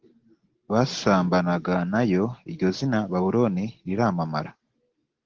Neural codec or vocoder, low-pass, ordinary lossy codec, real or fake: none; 7.2 kHz; Opus, 16 kbps; real